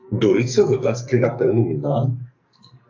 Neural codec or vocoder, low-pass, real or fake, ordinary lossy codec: codec, 44.1 kHz, 2.6 kbps, SNAC; 7.2 kHz; fake; AAC, 48 kbps